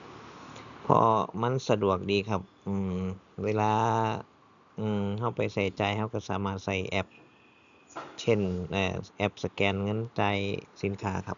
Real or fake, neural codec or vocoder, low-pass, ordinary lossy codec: real; none; 7.2 kHz; none